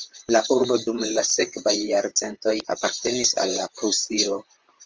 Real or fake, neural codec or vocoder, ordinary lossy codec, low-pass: fake; vocoder, 44.1 kHz, 128 mel bands, Pupu-Vocoder; Opus, 24 kbps; 7.2 kHz